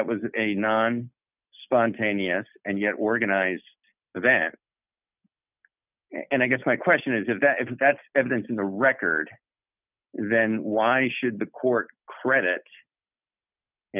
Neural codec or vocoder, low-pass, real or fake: none; 3.6 kHz; real